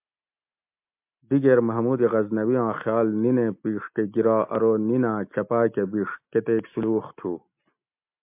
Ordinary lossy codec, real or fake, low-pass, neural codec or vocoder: MP3, 32 kbps; real; 3.6 kHz; none